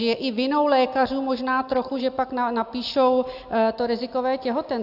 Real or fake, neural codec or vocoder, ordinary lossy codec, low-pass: real; none; AAC, 48 kbps; 5.4 kHz